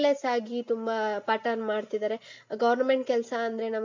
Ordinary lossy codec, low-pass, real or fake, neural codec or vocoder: MP3, 48 kbps; 7.2 kHz; real; none